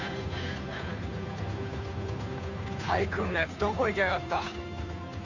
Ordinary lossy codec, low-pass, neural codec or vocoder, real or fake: none; 7.2 kHz; codec, 16 kHz, 2 kbps, FunCodec, trained on Chinese and English, 25 frames a second; fake